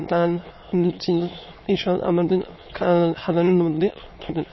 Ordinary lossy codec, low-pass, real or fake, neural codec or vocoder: MP3, 24 kbps; 7.2 kHz; fake; autoencoder, 22.05 kHz, a latent of 192 numbers a frame, VITS, trained on many speakers